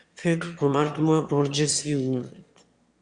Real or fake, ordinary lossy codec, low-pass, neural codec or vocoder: fake; Opus, 64 kbps; 9.9 kHz; autoencoder, 22.05 kHz, a latent of 192 numbers a frame, VITS, trained on one speaker